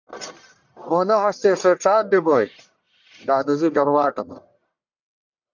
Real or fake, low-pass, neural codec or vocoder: fake; 7.2 kHz; codec, 44.1 kHz, 1.7 kbps, Pupu-Codec